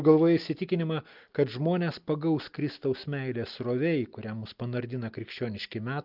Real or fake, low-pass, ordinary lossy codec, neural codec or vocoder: real; 5.4 kHz; Opus, 32 kbps; none